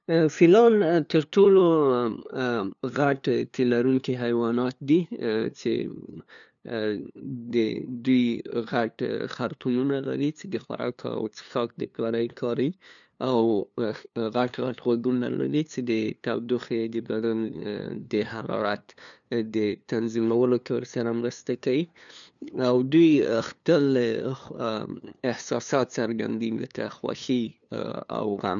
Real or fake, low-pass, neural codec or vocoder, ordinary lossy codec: fake; 7.2 kHz; codec, 16 kHz, 2 kbps, FunCodec, trained on LibriTTS, 25 frames a second; none